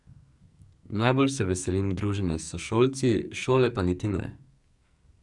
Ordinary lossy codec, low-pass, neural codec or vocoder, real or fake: none; 10.8 kHz; codec, 44.1 kHz, 2.6 kbps, SNAC; fake